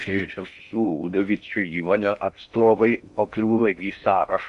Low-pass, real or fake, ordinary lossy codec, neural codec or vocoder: 10.8 kHz; fake; MP3, 64 kbps; codec, 16 kHz in and 24 kHz out, 0.6 kbps, FocalCodec, streaming, 4096 codes